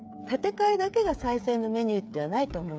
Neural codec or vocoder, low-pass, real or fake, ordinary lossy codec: codec, 16 kHz, 8 kbps, FreqCodec, smaller model; none; fake; none